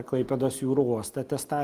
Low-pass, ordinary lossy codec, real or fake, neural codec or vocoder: 14.4 kHz; Opus, 32 kbps; real; none